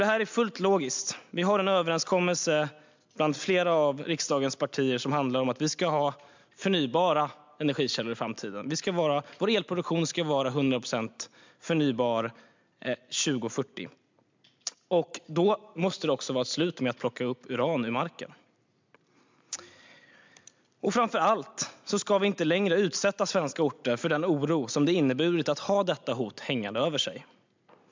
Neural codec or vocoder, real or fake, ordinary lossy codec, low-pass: none; real; MP3, 64 kbps; 7.2 kHz